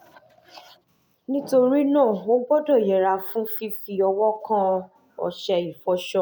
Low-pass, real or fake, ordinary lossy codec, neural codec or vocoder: 19.8 kHz; real; none; none